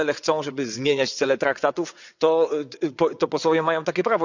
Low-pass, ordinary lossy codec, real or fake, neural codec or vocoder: 7.2 kHz; none; fake; vocoder, 22.05 kHz, 80 mel bands, WaveNeXt